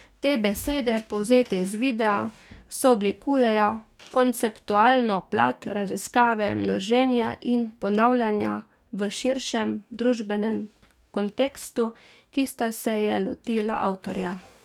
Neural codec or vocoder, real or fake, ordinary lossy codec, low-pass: codec, 44.1 kHz, 2.6 kbps, DAC; fake; none; 19.8 kHz